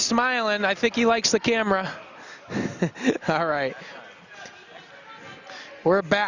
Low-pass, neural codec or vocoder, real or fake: 7.2 kHz; none; real